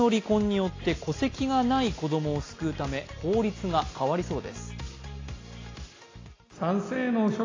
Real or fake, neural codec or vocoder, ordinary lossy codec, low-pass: real; none; AAC, 32 kbps; 7.2 kHz